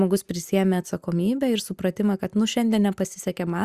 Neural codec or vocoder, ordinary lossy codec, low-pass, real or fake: none; Opus, 64 kbps; 14.4 kHz; real